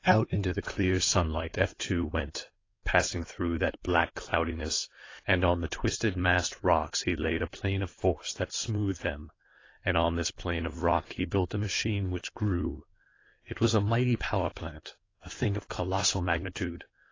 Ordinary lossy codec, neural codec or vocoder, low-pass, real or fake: AAC, 32 kbps; codec, 16 kHz in and 24 kHz out, 2.2 kbps, FireRedTTS-2 codec; 7.2 kHz; fake